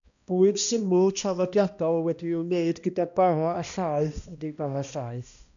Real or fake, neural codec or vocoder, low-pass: fake; codec, 16 kHz, 1 kbps, X-Codec, HuBERT features, trained on balanced general audio; 7.2 kHz